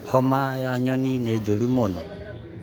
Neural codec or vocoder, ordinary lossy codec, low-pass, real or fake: codec, 44.1 kHz, 2.6 kbps, SNAC; none; none; fake